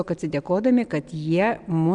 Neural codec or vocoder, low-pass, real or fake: none; 9.9 kHz; real